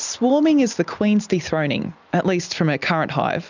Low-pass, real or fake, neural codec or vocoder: 7.2 kHz; real; none